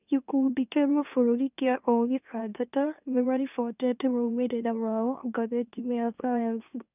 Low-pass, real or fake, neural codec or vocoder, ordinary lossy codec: 3.6 kHz; fake; autoencoder, 44.1 kHz, a latent of 192 numbers a frame, MeloTTS; none